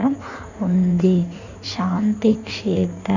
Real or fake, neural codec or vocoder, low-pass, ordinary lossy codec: fake; codec, 16 kHz in and 24 kHz out, 1.1 kbps, FireRedTTS-2 codec; 7.2 kHz; none